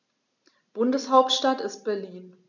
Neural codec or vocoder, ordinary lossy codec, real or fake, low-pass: none; none; real; 7.2 kHz